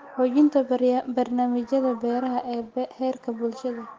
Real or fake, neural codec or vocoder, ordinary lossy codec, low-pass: real; none; Opus, 16 kbps; 7.2 kHz